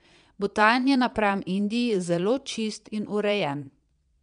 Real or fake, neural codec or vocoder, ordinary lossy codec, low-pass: fake; vocoder, 22.05 kHz, 80 mel bands, Vocos; MP3, 96 kbps; 9.9 kHz